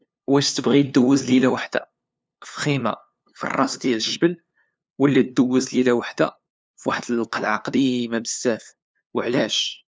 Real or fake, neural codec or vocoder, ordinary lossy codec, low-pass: fake; codec, 16 kHz, 2 kbps, FunCodec, trained on LibriTTS, 25 frames a second; none; none